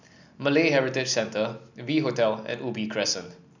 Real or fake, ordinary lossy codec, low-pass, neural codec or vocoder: real; none; 7.2 kHz; none